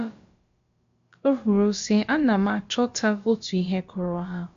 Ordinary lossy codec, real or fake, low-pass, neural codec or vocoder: MP3, 48 kbps; fake; 7.2 kHz; codec, 16 kHz, about 1 kbps, DyCAST, with the encoder's durations